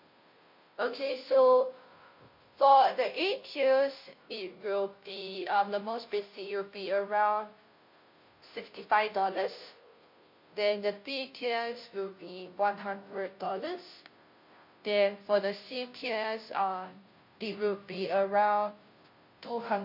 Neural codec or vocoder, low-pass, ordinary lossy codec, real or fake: codec, 16 kHz, 0.5 kbps, FunCodec, trained on Chinese and English, 25 frames a second; 5.4 kHz; MP3, 32 kbps; fake